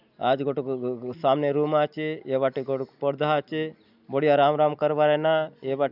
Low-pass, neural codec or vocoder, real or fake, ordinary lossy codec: 5.4 kHz; none; real; none